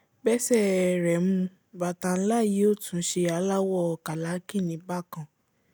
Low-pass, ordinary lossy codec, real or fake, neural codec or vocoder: none; none; real; none